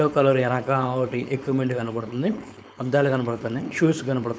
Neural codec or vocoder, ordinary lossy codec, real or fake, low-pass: codec, 16 kHz, 4.8 kbps, FACodec; none; fake; none